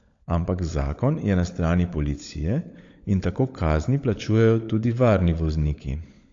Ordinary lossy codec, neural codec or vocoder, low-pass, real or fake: AAC, 48 kbps; codec, 16 kHz, 16 kbps, FunCodec, trained on LibriTTS, 50 frames a second; 7.2 kHz; fake